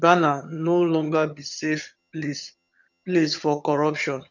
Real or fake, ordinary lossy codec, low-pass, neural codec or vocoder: fake; none; 7.2 kHz; vocoder, 22.05 kHz, 80 mel bands, HiFi-GAN